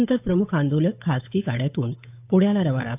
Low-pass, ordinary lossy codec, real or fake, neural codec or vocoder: 3.6 kHz; AAC, 32 kbps; fake; codec, 16 kHz, 8 kbps, FunCodec, trained on Chinese and English, 25 frames a second